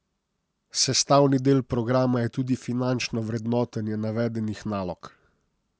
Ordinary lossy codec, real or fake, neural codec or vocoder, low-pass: none; real; none; none